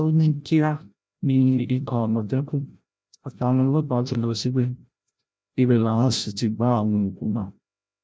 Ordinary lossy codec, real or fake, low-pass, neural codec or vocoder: none; fake; none; codec, 16 kHz, 0.5 kbps, FreqCodec, larger model